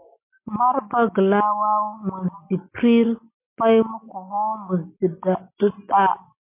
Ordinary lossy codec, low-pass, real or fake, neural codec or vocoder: MP3, 24 kbps; 3.6 kHz; real; none